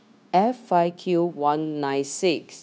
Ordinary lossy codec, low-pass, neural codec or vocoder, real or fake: none; none; codec, 16 kHz, 0.9 kbps, LongCat-Audio-Codec; fake